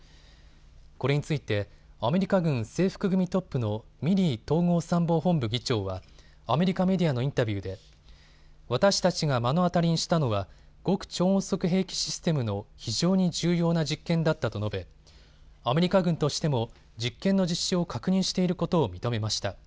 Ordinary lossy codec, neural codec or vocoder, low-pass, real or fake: none; none; none; real